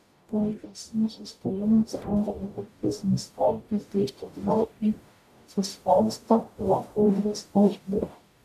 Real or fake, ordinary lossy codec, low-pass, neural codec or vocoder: fake; AAC, 96 kbps; 14.4 kHz; codec, 44.1 kHz, 0.9 kbps, DAC